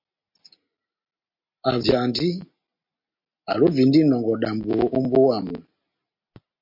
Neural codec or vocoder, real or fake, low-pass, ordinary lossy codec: none; real; 5.4 kHz; MP3, 32 kbps